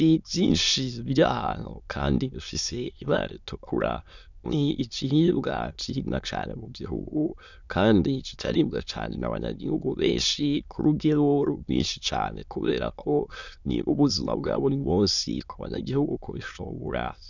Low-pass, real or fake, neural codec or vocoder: 7.2 kHz; fake; autoencoder, 22.05 kHz, a latent of 192 numbers a frame, VITS, trained on many speakers